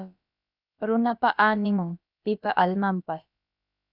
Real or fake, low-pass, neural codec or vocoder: fake; 5.4 kHz; codec, 16 kHz, about 1 kbps, DyCAST, with the encoder's durations